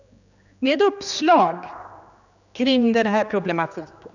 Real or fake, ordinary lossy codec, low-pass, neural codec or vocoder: fake; none; 7.2 kHz; codec, 16 kHz, 2 kbps, X-Codec, HuBERT features, trained on general audio